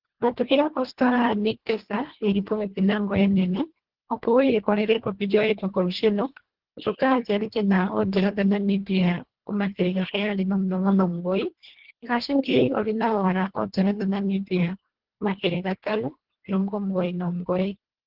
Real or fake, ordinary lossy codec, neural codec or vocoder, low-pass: fake; Opus, 16 kbps; codec, 24 kHz, 1.5 kbps, HILCodec; 5.4 kHz